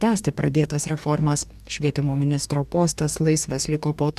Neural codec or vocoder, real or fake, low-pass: codec, 44.1 kHz, 2.6 kbps, DAC; fake; 14.4 kHz